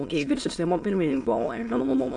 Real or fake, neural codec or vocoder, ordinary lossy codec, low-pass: fake; autoencoder, 22.05 kHz, a latent of 192 numbers a frame, VITS, trained on many speakers; AAC, 48 kbps; 9.9 kHz